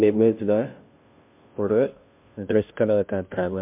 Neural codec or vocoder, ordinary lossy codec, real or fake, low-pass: codec, 16 kHz, 0.5 kbps, FunCodec, trained on Chinese and English, 25 frames a second; MP3, 32 kbps; fake; 3.6 kHz